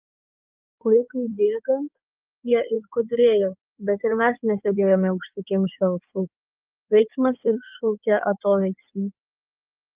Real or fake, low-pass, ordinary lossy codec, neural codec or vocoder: fake; 3.6 kHz; Opus, 24 kbps; codec, 16 kHz in and 24 kHz out, 2.2 kbps, FireRedTTS-2 codec